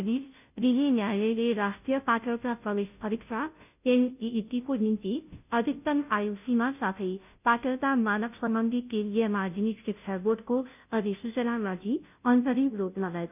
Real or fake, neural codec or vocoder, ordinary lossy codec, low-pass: fake; codec, 16 kHz, 0.5 kbps, FunCodec, trained on Chinese and English, 25 frames a second; none; 3.6 kHz